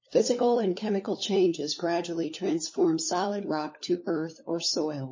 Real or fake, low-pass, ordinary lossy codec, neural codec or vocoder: fake; 7.2 kHz; MP3, 32 kbps; codec, 16 kHz, 4 kbps, FunCodec, trained on LibriTTS, 50 frames a second